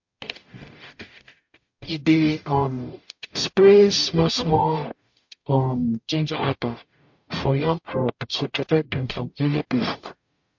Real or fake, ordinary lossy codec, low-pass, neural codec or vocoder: fake; MP3, 48 kbps; 7.2 kHz; codec, 44.1 kHz, 0.9 kbps, DAC